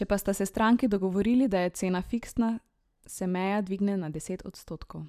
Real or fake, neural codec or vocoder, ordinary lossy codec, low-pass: fake; vocoder, 44.1 kHz, 128 mel bands every 512 samples, BigVGAN v2; none; 14.4 kHz